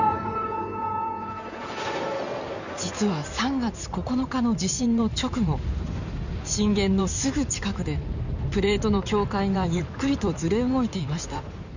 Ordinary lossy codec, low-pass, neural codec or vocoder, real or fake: none; 7.2 kHz; codec, 16 kHz in and 24 kHz out, 2.2 kbps, FireRedTTS-2 codec; fake